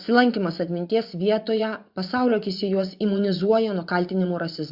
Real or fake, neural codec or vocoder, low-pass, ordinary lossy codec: fake; vocoder, 24 kHz, 100 mel bands, Vocos; 5.4 kHz; Opus, 64 kbps